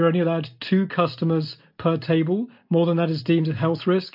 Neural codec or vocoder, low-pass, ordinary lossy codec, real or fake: none; 5.4 kHz; MP3, 48 kbps; real